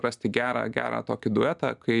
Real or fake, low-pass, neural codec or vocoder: real; 10.8 kHz; none